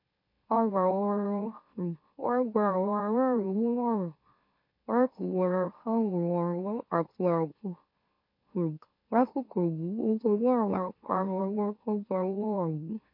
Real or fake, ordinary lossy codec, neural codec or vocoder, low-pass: fake; MP3, 32 kbps; autoencoder, 44.1 kHz, a latent of 192 numbers a frame, MeloTTS; 5.4 kHz